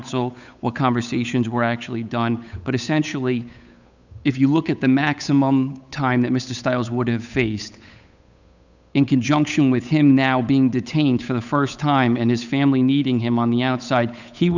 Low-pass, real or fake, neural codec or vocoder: 7.2 kHz; fake; codec, 16 kHz, 8 kbps, FunCodec, trained on Chinese and English, 25 frames a second